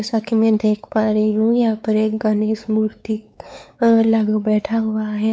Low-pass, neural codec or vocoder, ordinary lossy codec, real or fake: none; codec, 16 kHz, 4 kbps, X-Codec, WavLM features, trained on Multilingual LibriSpeech; none; fake